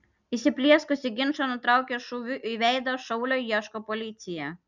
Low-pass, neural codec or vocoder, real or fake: 7.2 kHz; none; real